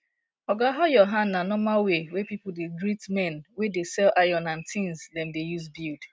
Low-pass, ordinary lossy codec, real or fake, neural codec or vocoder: none; none; real; none